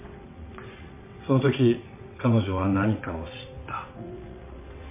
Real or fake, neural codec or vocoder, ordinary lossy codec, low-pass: fake; vocoder, 22.05 kHz, 80 mel bands, WaveNeXt; MP3, 24 kbps; 3.6 kHz